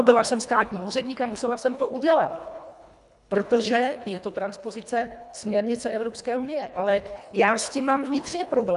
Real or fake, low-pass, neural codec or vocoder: fake; 10.8 kHz; codec, 24 kHz, 1.5 kbps, HILCodec